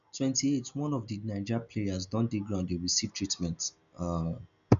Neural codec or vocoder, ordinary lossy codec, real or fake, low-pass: none; none; real; 7.2 kHz